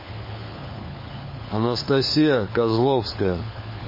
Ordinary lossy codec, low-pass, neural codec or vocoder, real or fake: MP3, 24 kbps; 5.4 kHz; codec, 16 kHz, 4 kbps, FunCodec, trained on LibriTTS, 50 frames a second; fake